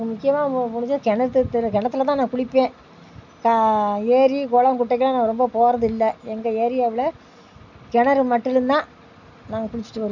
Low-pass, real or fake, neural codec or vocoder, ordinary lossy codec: 7.2 kHz; real; none; none